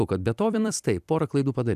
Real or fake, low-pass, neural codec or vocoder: fake; 14.4 kHz; vocoder, 44.1 kHz, 128 mel bands every 256 samples, BigVGAN v2